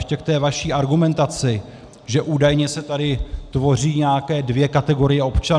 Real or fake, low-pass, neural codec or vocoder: real; 9.9 kHz; none